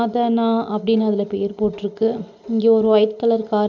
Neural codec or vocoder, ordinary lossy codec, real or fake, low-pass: none; none; real; 7.2 kHz